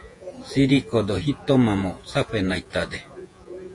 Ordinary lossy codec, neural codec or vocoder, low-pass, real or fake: AAC, 48 kbps; vocoder, 48 kHz, 128 mel bands, Vocos; 10.8 kHz; fake